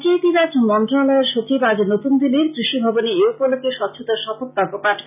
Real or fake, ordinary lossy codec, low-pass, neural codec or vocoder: real; none; 3.6 kHz; none